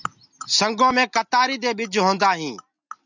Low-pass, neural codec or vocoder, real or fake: 7.2 kHz; none; real